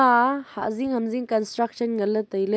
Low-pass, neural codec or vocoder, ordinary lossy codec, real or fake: none; none; none; real